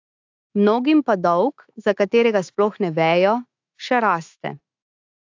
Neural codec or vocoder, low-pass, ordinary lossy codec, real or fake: autoencoder, 48 kHz, 128 numbers a frame, DAC-VAE, trained on Japanese speech; 7.2 kHz; AAC, 48 kbps; fake